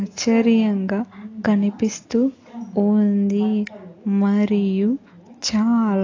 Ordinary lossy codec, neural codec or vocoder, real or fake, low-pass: AAC, 32 kbps; none; real; 7.2 kHz